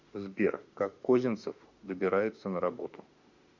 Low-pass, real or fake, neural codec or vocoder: 7.2 kHz; fake; autoencoder, 48 kHz, 32 numbers a frame, DAC-VAE, trained on Japanese speech